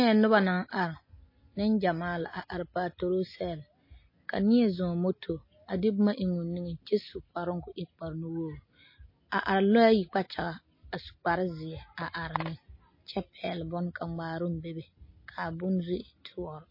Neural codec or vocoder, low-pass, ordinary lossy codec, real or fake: none; 5.4 kHz; MP3, 24 kbps; real